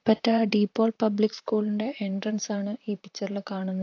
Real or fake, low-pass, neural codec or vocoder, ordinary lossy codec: fake; 7.2 kHz; vocoder, 44.1 kHz, 128 mel bands, Pupu-Vocoder; AAC, 48 kbps